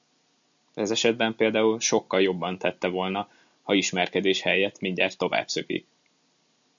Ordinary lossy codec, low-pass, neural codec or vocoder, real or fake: MP3, 64 kbps; 7.2 kHz; none; real